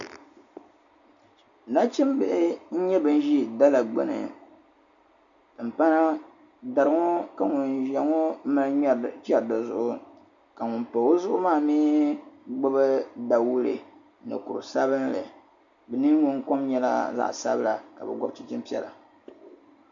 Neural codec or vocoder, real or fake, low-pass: none; real; 7.2 kHz